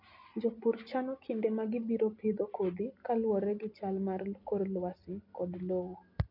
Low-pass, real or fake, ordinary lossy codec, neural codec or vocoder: 5.4 kHz; real; none; none